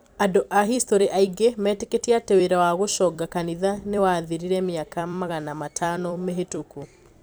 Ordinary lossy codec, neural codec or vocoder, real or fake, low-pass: none; vocoder, 44.1 kHz, 128 mel bands every 256 samples, BigVGAN v2; fake; none